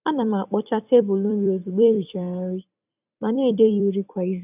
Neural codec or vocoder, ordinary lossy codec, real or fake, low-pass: vocoder, 44.1 kHz, 128 mel bands every 256 samples, BigVGAN v2; none; fake; 3.6 kHz